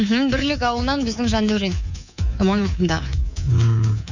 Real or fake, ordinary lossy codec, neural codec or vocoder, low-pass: fake; none; codec, 24 kHz, 3.1 kbps, DualCodec; 7.2 kHz